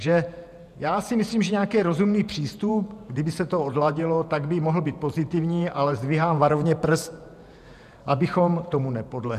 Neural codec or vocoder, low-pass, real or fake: vocoder, 48 kHz, 128 mel bands, Vocos; 14.4 kHz; fake